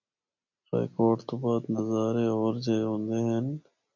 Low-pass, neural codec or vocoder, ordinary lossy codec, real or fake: 7.2 kHz; none; MP3, 64 kbps; real